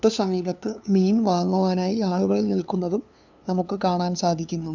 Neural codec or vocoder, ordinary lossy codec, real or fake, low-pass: codec, 16 kHz, 2 kbps, FunCodec, trained on LibriTTS, 25 frames a second; none; fake; 7.2 kHz